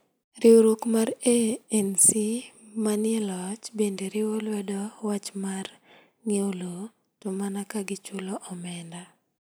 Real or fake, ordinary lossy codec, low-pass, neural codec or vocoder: real; none; none; none